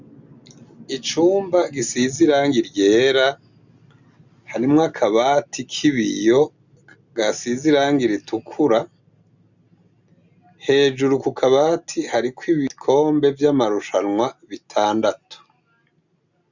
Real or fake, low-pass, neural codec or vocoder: real; 7.2 kHz; none